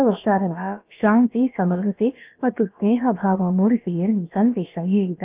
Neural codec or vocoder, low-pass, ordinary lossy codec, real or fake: codec, 16 kHz, about 1 kbps, DyCAST, with the encoder's durations; 3.6 kHz; Opus, 24 kbps; fake